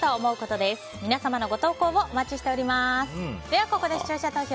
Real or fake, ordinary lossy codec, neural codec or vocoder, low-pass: real; none; none; none